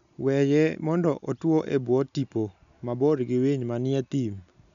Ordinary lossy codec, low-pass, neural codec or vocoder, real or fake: none; 7.2 kHz; none; real